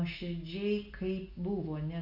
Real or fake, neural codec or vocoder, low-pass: real; none; 5.4 kHz